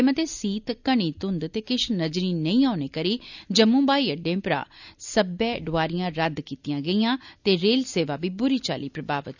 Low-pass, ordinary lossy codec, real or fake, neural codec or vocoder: 7.2 kHz; none; real; none